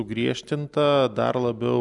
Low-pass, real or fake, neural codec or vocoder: 10.8 kHz; real; none